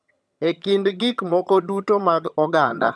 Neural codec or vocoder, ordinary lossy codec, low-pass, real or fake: vocoder, 22.05 kHz, 80 mel bands, HiFi-GAN; none; none; fake